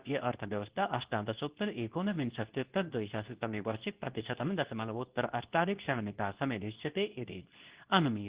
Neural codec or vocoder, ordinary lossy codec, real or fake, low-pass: codec, 24 kHz, 0.9 kbps, WavTokenizer, medium speech release version 2; Opus, 16 kbps; fake; 3.6 kHz